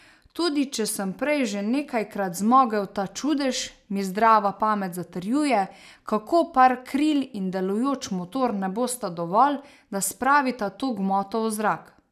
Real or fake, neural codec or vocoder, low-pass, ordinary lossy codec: real; none; 14.4 kHz; none